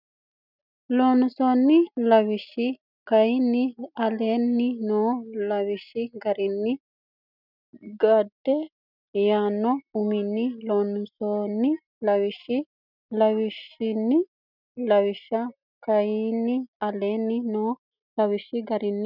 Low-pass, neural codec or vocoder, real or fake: 5.4 kHz; none; real